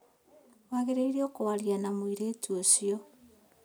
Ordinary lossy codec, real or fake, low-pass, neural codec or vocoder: none; real; none; none